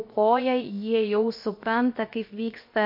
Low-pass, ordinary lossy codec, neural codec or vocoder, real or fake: 5.4 kHz; MP3, 32 kbps; codec, 16 kHz, 0.7 kbps, FocalCodec; fake